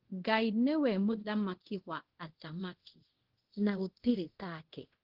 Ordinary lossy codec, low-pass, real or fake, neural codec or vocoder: Opus, 16 kbps; 5.4 kHz; fake; codec, 24 kHz, 0.5 kbps, DualCodec